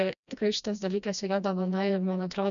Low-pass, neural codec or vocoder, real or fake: 7.2 kHz; codec, 16 kHz, 1 kbps, FreqCodec, smaller model; fake